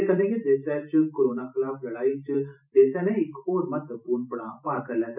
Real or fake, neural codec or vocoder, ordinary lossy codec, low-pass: fake; vocoder, 44.1 kHz, 128 mel bands every 512 samples, BigVGAN v2; none; 3.6 kHz